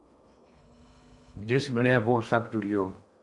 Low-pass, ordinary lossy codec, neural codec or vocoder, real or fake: 10.8 kHz; MP3, 64 kbps; codec, 16 kHz in and 24 kHz out, 0.8 kbps, FocalCodec, streaming, 65536 codes; fake